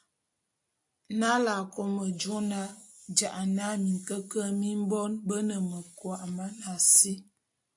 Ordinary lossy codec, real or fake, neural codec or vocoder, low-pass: AAC, 64 kbps; real; none; 10.8 kHz